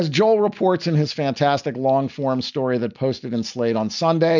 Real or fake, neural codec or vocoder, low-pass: real; none; 7.2 kHz